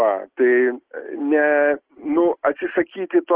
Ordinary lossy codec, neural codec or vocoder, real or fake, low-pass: Opus, 16 kbps; none; real; 3.6 kHz